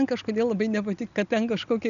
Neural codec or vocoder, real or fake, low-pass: none; real; 7.2 kHz